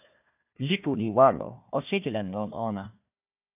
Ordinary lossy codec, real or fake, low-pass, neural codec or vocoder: AAC, 32 kbps; fake; 3.6 kHz; codec, 16 kHz, 1 kbps, FunCodec, trained on Chinese and English, 50 frames a second